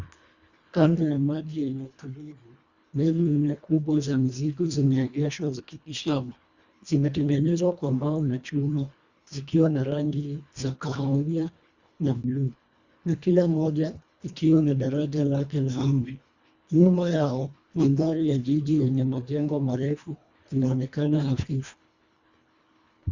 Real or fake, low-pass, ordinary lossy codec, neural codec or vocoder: fake; 7.2 kHz; Opus, 64 kbps; codec, 24 kHz, 1.5 kbps, HILCodec